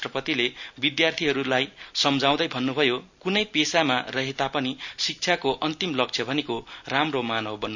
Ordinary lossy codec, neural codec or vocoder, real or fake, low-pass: none; none; real; 7.2 kHz